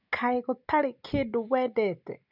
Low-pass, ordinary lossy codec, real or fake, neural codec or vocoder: 5.4 kHz; none; real; none